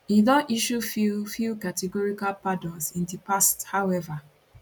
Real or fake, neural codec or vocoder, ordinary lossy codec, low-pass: real; none; none; 19.8 kHz